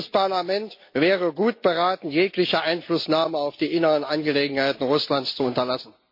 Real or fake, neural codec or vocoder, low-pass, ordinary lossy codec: real; none; 5.4 kHz; MP3, 32 kbps